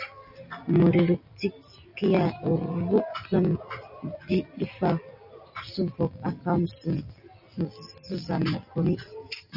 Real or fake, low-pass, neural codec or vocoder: real; 5.4 kHz; none